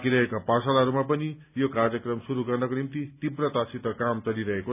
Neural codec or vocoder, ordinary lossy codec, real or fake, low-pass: none; none; real; 3.6 kHz